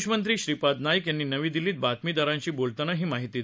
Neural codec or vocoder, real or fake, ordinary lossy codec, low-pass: none; real; none; none